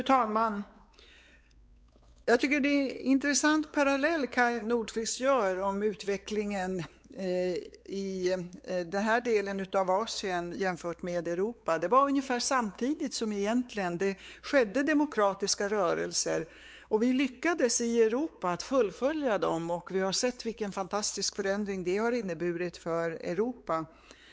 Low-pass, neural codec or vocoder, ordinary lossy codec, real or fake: none; codec, 16 kHz, 4 kbps, X-Codec, WavLM features, trained on Multilingual LibriSpeech; none; fake